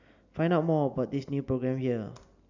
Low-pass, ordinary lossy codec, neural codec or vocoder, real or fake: 7.2 kHz; none; none; real